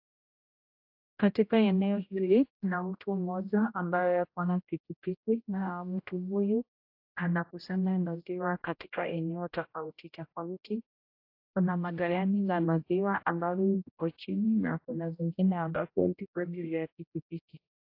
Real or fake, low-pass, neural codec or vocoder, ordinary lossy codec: fake; 5.4 kHz; codec, 16 kHz, 0.5 kbps, X-Codec, HuBERT features, trained on general audio; AAC, 32 kbps